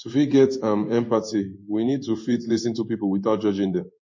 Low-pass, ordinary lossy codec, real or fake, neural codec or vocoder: 7.2 kHz; MP3, 32 kbps; fake; codec, 16 kHz in and 24 kHz out, 1 kbps, XY-Tokenizer